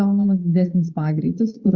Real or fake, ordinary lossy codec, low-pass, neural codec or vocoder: fake; Opus, 64 kbps; 7.2 kHz; vocoder, 22.05 kHz, 80 mel bands, Vocos